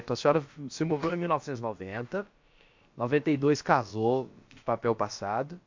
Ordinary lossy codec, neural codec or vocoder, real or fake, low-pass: MP3, 48 kbps; codec, 16 kHz, about 1 kbps, DyCAST, with the encoder's durations; fake; 7.2 kHz